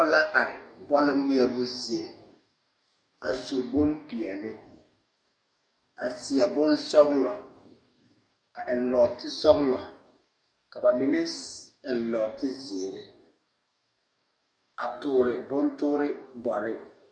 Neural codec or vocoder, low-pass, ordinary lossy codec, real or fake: codec, 44.1 kHz, 2.6 kbps, DAC; 9.9 kHz; MP3, 64 kbps; fake